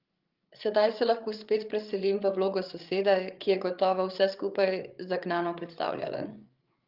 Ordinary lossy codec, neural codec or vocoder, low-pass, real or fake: Opus, 32 kbps; codec, 16 kHz, 8 kbps, FreqCodec, larger model; 5.4 kHz; fake